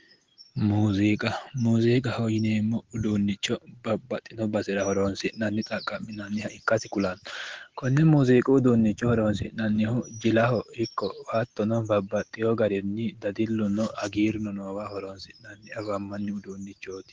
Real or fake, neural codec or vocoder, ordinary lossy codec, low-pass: real; none; Opus, 16 kbps; 7.2 kHz